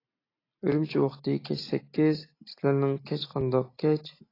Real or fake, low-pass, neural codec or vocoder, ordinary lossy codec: real; 5.4 kHz; none; AAC, 24 kbps